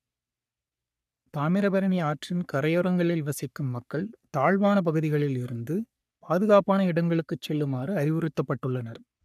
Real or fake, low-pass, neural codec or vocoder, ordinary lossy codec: fake; 14.4 kHz; codec, 44.1 kHz, 3.4 kbps, Pupu-Codec; none